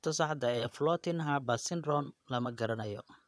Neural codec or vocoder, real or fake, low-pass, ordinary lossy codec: vocoder, 22.05 kHz, 80 mel bands, Vocos; fake; none; none